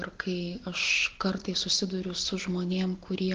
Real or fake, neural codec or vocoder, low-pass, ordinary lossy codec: real; none; 7.2 kHz; Opus, 16 kbps